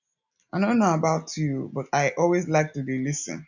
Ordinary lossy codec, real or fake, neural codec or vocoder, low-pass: none; real; none; 7.2 kHz